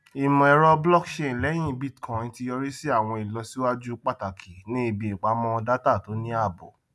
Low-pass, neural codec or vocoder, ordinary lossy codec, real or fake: none; none; none; real